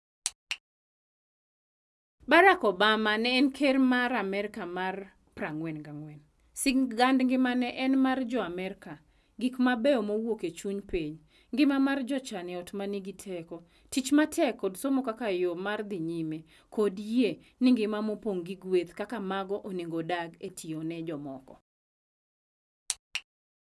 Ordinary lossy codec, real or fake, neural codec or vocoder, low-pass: none; real; none; none